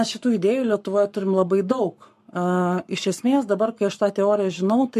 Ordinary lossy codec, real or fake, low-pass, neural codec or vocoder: MP3, 64 kbps; fake; 14.4 kHz; codec, 44.1 kHz, 7.8 kbps, Pupu-Codec